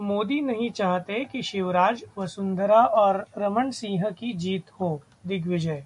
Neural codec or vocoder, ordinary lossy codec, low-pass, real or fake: none; MP3, 64 kbps; 10.8 kHz; real